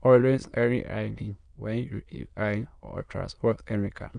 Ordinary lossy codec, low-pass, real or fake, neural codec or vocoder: none; 9.9 kHz; fake; autoencoder, 22.05 kHz, a latent of 192 numbers a frame, VITS, trained on many speakers